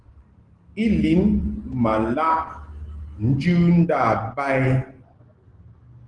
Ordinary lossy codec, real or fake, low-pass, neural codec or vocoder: Opus, 16 kbps; real; 9.9 kHz; none